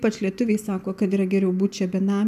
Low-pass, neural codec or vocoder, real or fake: 14.4 kHz; none; real